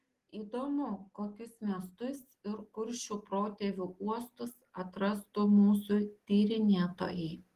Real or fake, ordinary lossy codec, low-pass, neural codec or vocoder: real; Opus, 16 kbps; 14.4 kHz; none